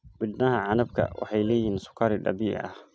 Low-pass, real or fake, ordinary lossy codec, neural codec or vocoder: none; real; none; none